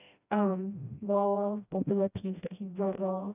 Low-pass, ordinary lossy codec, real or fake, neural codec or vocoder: 3.6 kHz; none; fake; codec, 16 kHz, 1 kbps, FreqCodec, smaller model